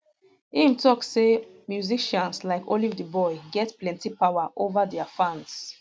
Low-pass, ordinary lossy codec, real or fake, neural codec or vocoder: none; none; real; none